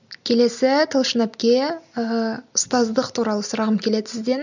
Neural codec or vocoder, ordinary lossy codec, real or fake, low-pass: none; none; real; 7.2 kHz